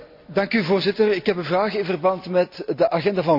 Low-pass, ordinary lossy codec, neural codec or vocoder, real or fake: 5.4 kHz; MP3, 48 kbps; none; real